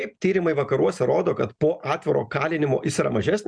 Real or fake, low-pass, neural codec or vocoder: real; 9.9 kHz; none